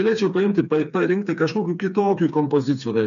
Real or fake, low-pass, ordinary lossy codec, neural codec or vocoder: fake; 7.2 kHz; MP3, 96 kbps; codec, 16 kHz, 4 kbps, FreqCodec, smaller model